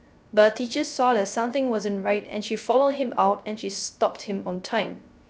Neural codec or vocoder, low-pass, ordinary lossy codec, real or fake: codec, 16 kHz, 0.3 kbps, FocalCodec; none; none; fake